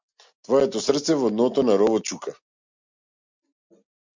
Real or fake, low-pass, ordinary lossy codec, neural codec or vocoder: real; 7.2 kHz; MP3, 64 kbps; none